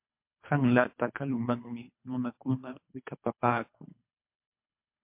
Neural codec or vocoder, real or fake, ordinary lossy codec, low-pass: codec, 24 kHz, 3 kbps, HILCodec; fake; MP3, 32 kbps; 3.6 kHz